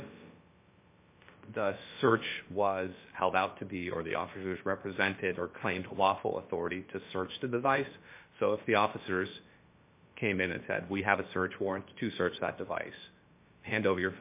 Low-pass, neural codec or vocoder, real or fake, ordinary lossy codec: 3.6 kHz; codec, 16 kHz, about 1 kbps, DyCAST, with the encoder's durations; fake; MP3, 24 kbps